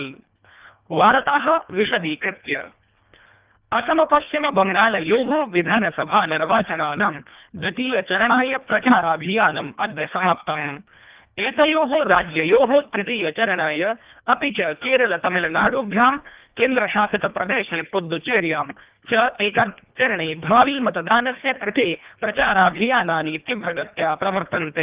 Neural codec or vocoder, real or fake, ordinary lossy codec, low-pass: codec, 24 kHz, 1.5 kbps, HILCodec; fake; Opus, 32 kbps; 3.6 kHz